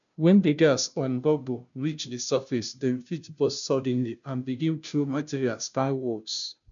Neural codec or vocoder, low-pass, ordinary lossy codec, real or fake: codec, 16 kHz, 0.5 kbps, FunCodec, trained on Chinese and English, 25 frames a second; 7.2 kHz; none; fake